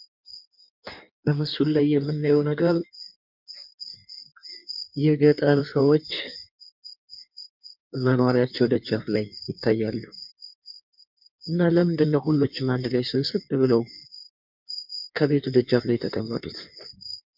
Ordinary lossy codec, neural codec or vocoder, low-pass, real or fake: MP3, 48 kbps; codec, 16 kHz in and 24 kHz out, 1.1 kbps, FireRedTTS-2 codec; 5.4 kHz; fake